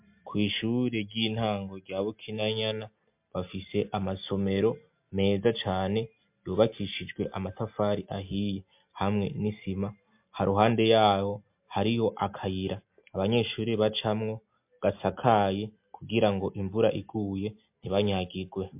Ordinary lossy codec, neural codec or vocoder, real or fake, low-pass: MP3, 32 kbps; none; real; 3.6 kHz